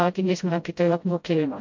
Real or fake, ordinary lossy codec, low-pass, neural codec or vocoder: fake; MP3, 48 kbps; 7.2 kHz; codec, 16 kHz, 0.5 kbps, FreqCodec, smaller model